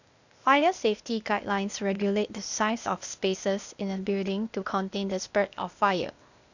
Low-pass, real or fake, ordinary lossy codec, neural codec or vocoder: 7.2 kHz; fake; none; codec, 16 kHz, 0.8 kbps, ZipCodec